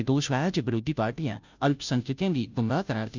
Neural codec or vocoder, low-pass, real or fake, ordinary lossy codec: codec, 16 kHz, 0.5 kbps, FunCodec, trained on Chinese and English, 25 frames a second; 7.2 kHz; fake; none